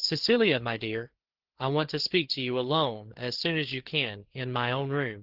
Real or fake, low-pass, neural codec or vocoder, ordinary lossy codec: fake; 5.4 kHz; codec, 16 kHz, 4 kbps, FreqCodec, larger model; Opus, 16 kbps